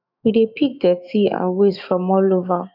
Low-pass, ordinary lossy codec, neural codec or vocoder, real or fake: 5.4 kHz; none; autoencoder, 48 kHz, 128 numbers a frame, DAC-VAE, trained on Japanese speech; fake